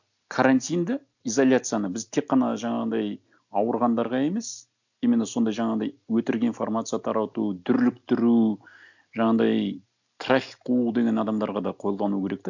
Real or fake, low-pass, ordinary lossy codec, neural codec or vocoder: real; 7.2 kHz; none; none